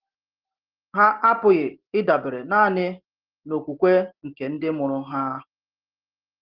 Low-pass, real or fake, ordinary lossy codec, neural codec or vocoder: 5.4 kHz; real; Opus, 16 kbps; none